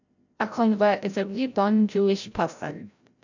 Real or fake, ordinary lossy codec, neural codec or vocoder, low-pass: fake; none; codec, 16 kHz, 0.5 kbps, FreqCodec, larger model; 7.2 kHz